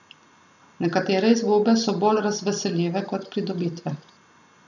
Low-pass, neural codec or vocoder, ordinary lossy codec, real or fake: 7.2 kHz; none; none; real